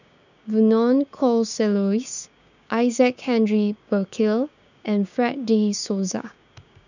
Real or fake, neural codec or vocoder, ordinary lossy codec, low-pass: fake; codec, 16 kHz, 6 kbps, DAC; none; 7.2 kHz